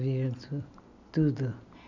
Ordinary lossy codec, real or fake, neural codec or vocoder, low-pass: none; real; none; 7.2 kHz